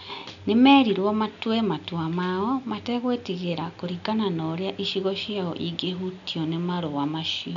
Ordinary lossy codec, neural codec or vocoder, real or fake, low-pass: none; none; real; 7.2 kHz